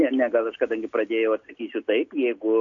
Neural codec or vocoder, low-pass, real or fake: none; 7.2 kHz; real